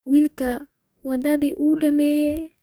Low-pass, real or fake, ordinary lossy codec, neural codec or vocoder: none; fake; none; codec, 44.1 kHz, 2.6 kbps, DAC